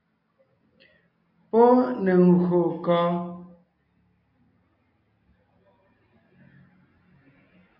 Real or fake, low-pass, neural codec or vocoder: real; 5.4 kHz; none